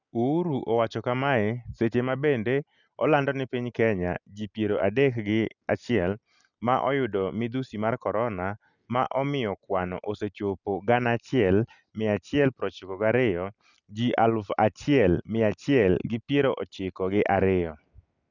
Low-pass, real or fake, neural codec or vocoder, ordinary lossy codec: 7.2 kHz; real; none; none